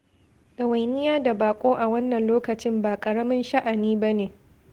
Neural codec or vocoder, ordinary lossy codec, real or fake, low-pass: none; Opus, 16 kbps; real; 19.8 kHz